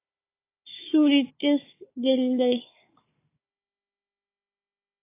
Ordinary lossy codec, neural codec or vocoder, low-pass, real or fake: AAC, 24 kbps; codec, 16 kHz, 4 kbps, FunCodec, trained on Chinese and English, 50 frames a second; 3.6 kHz; fake